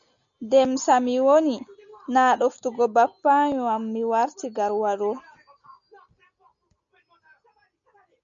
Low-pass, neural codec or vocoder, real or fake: 7.2 kHz; none; real